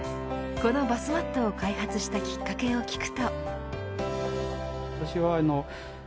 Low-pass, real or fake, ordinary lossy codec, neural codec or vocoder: none; real; none; none